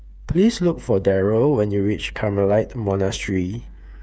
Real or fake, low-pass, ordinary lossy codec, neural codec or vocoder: fake; none; none; codec, 16 kHz, 8 kbps, FreqCodec, smaller model